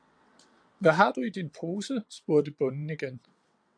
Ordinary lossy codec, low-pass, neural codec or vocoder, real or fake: AAC, 64 kbps; 9.9 kHz; vocoder, 22.05 kHz, 80 mel bands, WaveNeXt; fake